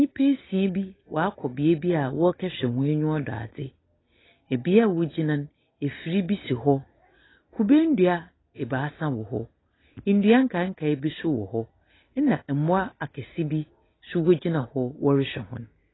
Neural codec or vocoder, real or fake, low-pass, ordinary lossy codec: none; real; 7.2 kHz; AAC, 16 kbps